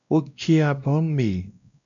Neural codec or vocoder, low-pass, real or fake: codec, 16 kHz, 1 kbps, X-Codec, WavLM features, trained on Multilingual LibriSpeech; 7.2 kHz; fake